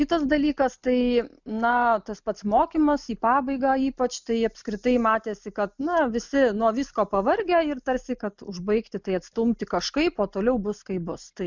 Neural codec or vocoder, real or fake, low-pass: none; real; 7.2 kHz